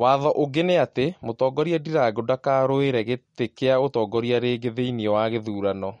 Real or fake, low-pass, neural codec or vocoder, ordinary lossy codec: real; 10.8 kHz; none; MP3, 48 kbps